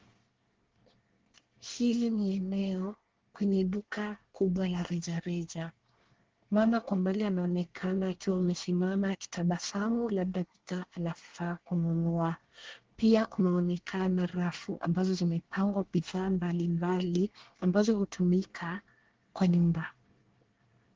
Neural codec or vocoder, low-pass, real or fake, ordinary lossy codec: codec, 24 kHz, 1 kbps, SNAC; 7.2 kHz; fake; Opus, 16 kbps